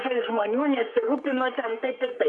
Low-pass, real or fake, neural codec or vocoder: 10.8 kHz; fake; codec, 44.1 kHz, 3.4 kbps, Pupu-Codec